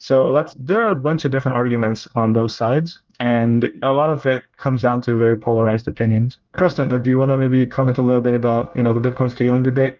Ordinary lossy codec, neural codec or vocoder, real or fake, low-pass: Opus, 24 kbps; codec, 24 kHz, 1 kbps, SNAC; fake; 7.2 kHz